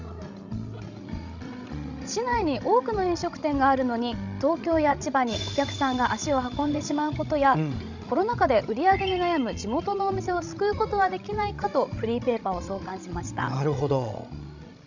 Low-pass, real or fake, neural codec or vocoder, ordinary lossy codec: 7.2 kHz; fake; codec, 16 kHz, 16 kbps, FreqCodec, larger model; none